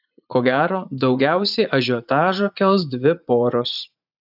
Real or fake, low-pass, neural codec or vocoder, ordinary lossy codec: fake; 5.4 kHz; vocoder, 44.1 kHz, 80 mel bands, Vocos; AAC, 48 kbps